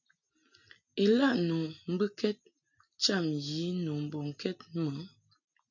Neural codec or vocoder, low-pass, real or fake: none; 7.2 kHz; real